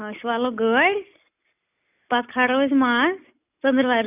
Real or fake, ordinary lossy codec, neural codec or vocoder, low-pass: real; none; none; 3.6 kHz